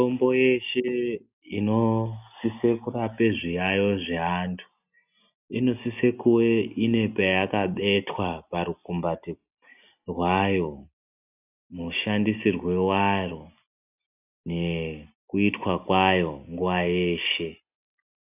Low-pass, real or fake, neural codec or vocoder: 3.6 kHz; real; none